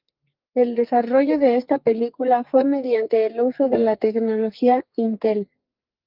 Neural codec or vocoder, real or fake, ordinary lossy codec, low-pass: codec, 44.1 kHz, 2.6 kbps, SNAC; fake; Opus, 24 kbps; 5.4 kHz